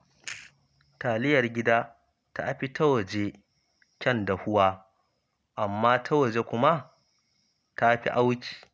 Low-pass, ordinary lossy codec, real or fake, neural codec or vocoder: none; none; real; none